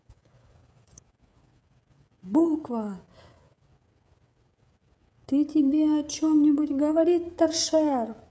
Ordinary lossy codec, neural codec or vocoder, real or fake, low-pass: none; codec, 16 kHz, 16 kbps, FreqCodec, smaller model; fake; none